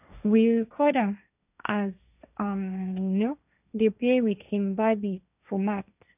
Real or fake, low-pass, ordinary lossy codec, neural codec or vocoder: fake; 3.6 kHz; none; codec, 16 kHz, 1.1 kbps, Voila-Tokenizer